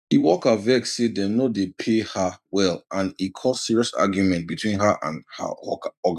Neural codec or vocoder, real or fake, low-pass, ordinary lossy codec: vocoder, 44.1 kHz, 128 mel bands every 512 samples, BigVGAN v2; fake; 14.4 kHz; none